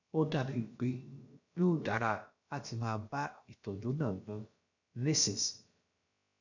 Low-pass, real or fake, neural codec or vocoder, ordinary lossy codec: 7.2 kHz; fake; codec, 16 kHz, about 1 kbps, DyCAST, with the encoder's durations; none